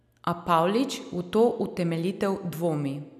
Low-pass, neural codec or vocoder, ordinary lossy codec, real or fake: 14.4 kHz; none; none; real